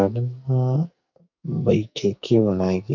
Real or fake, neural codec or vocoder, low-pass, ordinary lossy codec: fake; codec, 44.1 kHz, 2.6 kbps, SNAC; 7.2 kHz; none